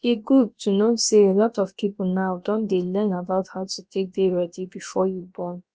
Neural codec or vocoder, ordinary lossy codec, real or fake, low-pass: codec, 16 kHz, about 1 kbps, DyCAST, with the encoder's durations; none; fake; none